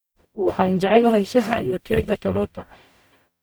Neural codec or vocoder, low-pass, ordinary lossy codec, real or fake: codec, 44.1 kHz, 0.9 kbps, DAC; none; none; fake